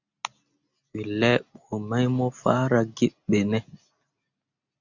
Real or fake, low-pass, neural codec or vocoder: real; 7.2 kHz; none